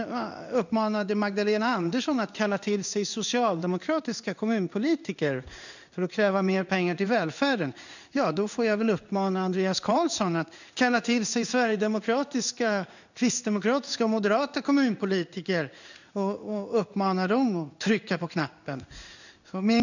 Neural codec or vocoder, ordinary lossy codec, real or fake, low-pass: codec, 16 kHz in and 24 kHz out, 1 kbps, XY-Tokenizer; none; fake; 7.2 kHz